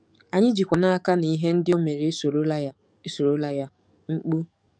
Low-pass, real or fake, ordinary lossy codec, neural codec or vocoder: 9.9 kHz; fake; none; codec, 44.1 kHz, 7.8 kbps, DAC